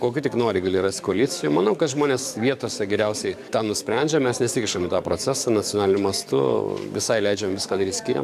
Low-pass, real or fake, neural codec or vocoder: 14.4 kHz; fake; codec, 44.1 kHz, 7.8 kbps, DAC